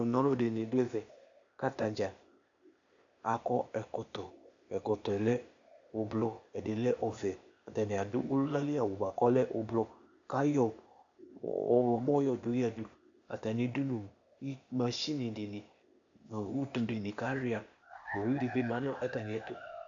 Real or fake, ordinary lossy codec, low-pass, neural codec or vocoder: fake; AAC, 64 kbps; 7.2 kHz; codec, 16 kHz, 0.8 kbps, ZipCodec